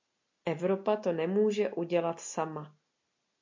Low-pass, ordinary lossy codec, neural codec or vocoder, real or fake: 7.2 kHz; MP3, 48 kbps; none; real